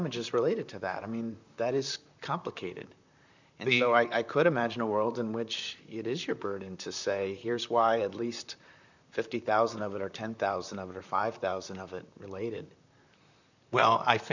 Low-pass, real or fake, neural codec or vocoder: 7.2 kHz; fake; vocoder, 44.1 kHz, 128 mel bands, Pupu-Vocoder